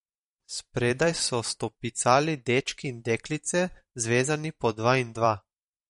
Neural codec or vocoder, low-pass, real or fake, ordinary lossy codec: vocoder, 44.1 kHz, 128 mel bands every 512 samples, BigVGAN v2; 19.8 kHz; fake; MP3, 48 kbps